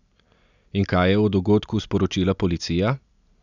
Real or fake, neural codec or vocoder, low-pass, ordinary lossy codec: real; none; 7.2 kHz; none